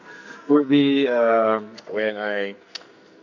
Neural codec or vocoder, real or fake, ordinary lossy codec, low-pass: codec, 44.1 kHz, 2.6 kbps, SNAC; fake; none; 7.2 kHz